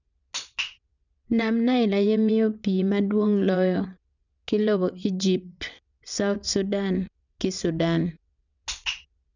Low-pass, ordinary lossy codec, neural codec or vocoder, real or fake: 7.2 kHz; none; vocoder, 22.05 kHz, 80 mel bands, WaveNeXt; fake